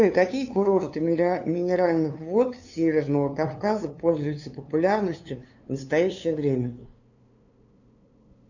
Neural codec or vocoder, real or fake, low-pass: codec, 16 kHz, 2 kbps, FunCodec, trained on LibriTTS, 25 frames a second; fake; 7.2 kHz